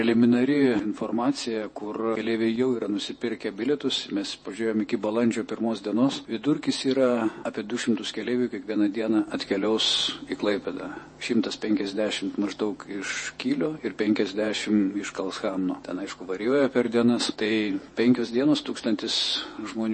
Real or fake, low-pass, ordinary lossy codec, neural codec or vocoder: fake; 9.9 kHz; MP3, 32 kbps; vocoder, 48 kHz, 128 mel bands, Vocos